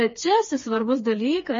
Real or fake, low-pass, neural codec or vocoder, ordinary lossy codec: fake; 7.2 kHz; codec, 16 kHz, 4 kbps, FreqCodec, smaller model; MP3, 32 kbps